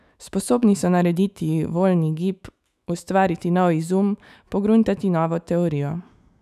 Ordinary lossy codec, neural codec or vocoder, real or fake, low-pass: none; autoencoder, 48 kHz, 128 numbers a frame, DAC-VAE, trained on Japanese speech; fake; 14.4 kHz